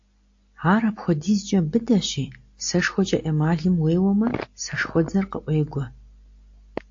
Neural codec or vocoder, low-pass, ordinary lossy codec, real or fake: none; 7.2 kHz; AAC, 48 kbps; real